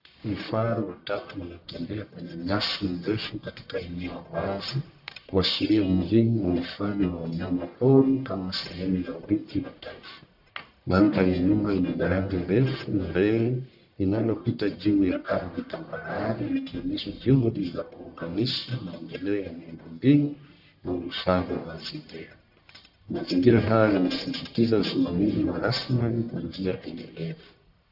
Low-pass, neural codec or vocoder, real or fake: 5.4 kHz; codec, 44.1 kHz, 1.7 kbps, Pupu-Codec; fake